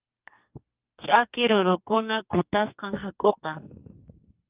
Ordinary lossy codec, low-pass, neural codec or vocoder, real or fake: Opus, 24 kbps; 3.6 kHz; codec, 44.1 kHz, 2.6 kbps, SNAC; fake